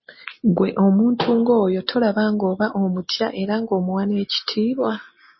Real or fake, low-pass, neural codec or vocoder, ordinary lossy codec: real; 7.2 kHz; none; MP3, 24 kbps